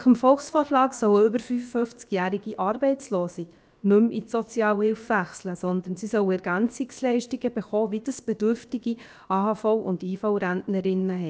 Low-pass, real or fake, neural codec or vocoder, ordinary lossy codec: none; fake; codec, 16 kHz, about 1 kbps, DyCAST, with the encoder's durations; none